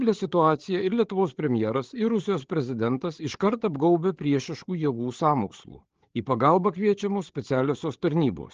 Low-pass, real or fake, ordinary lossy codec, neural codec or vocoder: 7.2 kHz; fake; Opus, 16 kbps; codec, 16 kHz, 8 kbps, FreqCodec, larger model